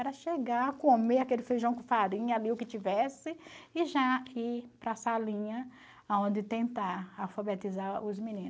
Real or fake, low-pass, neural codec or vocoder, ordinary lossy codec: real; none; none; none